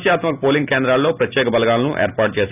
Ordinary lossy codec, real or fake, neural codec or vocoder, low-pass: none; real; none; 3.6 kHz